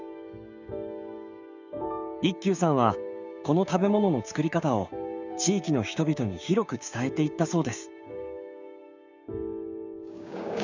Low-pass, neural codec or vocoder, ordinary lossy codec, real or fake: 7.2 kHz; codec, 16 kHz, 6 kbps, DAC; none; fake